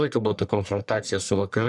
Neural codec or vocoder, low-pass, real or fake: codec, 44.1 kHz, 1.7 kbps, Pupu-Codec; 10.8 kHz; fake